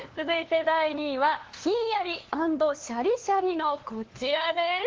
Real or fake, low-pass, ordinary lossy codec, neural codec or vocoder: fake; 7.2 kHz; Opus, 16 kbps; codec, 16 kHz, 2 kbps, FunCodec, trained on LibriTTS, 25 frames a second